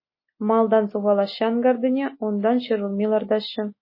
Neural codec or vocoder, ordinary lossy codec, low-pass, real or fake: none; MP3, 24 kbps; 5.4 kHz; real